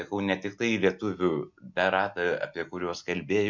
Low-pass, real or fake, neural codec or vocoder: 7.2 kHz; real; none